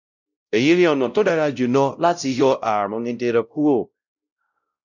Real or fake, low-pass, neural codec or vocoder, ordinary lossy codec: fake; 7.2 kHz; codec, 16 kHz, 0.5 kbps, X-Codec, WavLM features, trained on Multilingual LibriSpeech; none